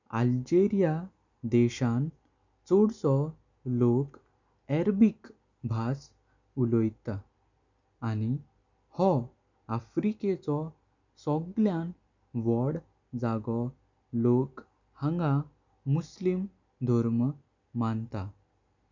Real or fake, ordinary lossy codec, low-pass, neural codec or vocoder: real; none; 7.2 kHz; none